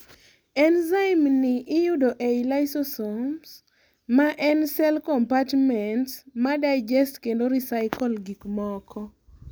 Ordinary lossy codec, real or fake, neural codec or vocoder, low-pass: none; real; none; none